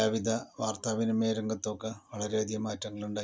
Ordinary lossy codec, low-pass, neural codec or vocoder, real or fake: none; none; none; real